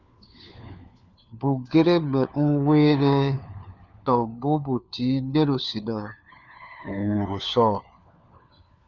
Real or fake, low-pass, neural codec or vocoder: fake; 7.2 kHz; codec, 16 kHz, 4 kbps, FunCodec, trained on LibriTTS, 50 frames a second